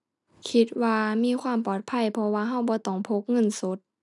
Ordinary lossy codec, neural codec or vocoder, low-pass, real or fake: none; none; 10.8 kHz; real